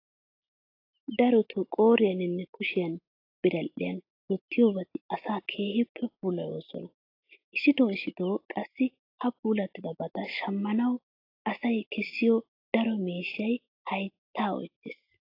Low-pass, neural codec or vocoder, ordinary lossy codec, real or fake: 5.4 kHz; none; AAC, 32 kbps; real